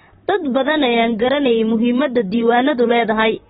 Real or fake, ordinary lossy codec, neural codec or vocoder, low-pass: fake; AAC, 16 kbps; vocoder, 44.1 kHz, 128 mel bands, Pupu-Vocoder; 19.8 kHz